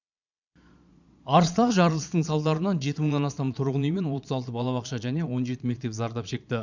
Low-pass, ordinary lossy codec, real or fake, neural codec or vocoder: 7.2 kHz; none; fake; vocoder, 22.05 kHz, 80 mel bands, Vocos